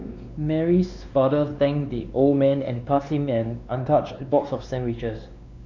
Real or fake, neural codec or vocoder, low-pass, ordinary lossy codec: fake; codec, 16 kHz, 2 kbps, X-Codec, WavLM features, trained on Multilingual LibriSpeech; 7.2 kHz; none